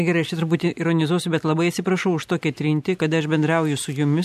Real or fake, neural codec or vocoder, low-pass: real; none; 14.4 kHz